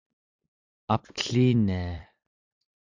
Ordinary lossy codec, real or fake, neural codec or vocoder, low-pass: MP3, 64 kbps; fake; vocoder, 44.1 kHz, 128 mel bands every 256 samples, BigVGAN v2; 7.2 kHz